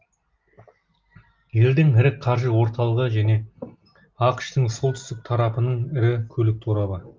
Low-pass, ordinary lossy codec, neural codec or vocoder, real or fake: 7.2 kHz; Opus, 32 kbps; none; real